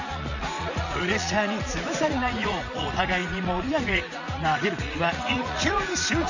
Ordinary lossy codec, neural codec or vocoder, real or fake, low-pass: none; vocoder, 44.1 kHz, 80 mel bands, Vocos; fake; 7.2 kHz